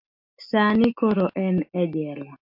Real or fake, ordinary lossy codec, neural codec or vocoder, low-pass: real; MP3, 48 kbps; none; 5.4 kHz